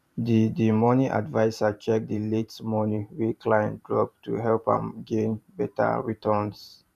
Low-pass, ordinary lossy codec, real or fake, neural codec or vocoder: 14.4 kHz; none; fake; vocoder, 44.1 kHz, 128 mel bands every 512 samples, BigVGAN v2